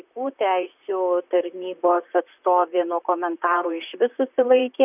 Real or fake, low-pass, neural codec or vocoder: fake; 3.6 kHz; vocoder, 44.1 kHz, 128 mel bands, Pupu-Vocoder